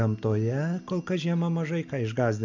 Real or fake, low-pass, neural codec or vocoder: real; 7.2 kHz; none